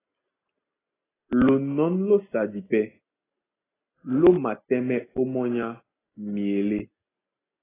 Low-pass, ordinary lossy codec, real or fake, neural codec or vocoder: 3.6 kHz; AAC, 16 kbps; real; none